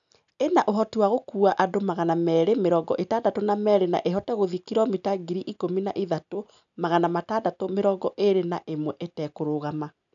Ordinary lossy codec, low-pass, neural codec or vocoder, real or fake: none; 7.2 kHz; none; real